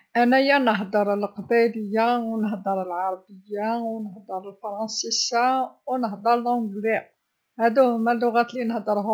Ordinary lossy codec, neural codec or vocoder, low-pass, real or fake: none; none; none; real